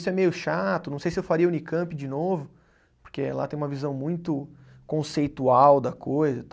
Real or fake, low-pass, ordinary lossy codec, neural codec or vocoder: real; none; none; none